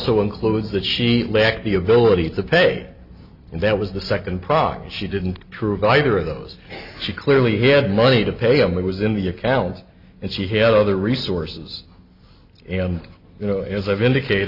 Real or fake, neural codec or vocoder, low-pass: real; none; 5.4 kHz